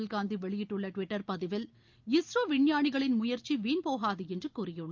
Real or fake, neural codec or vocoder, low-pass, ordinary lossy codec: real; none; 7.2 kHz; Opus, 24 kbps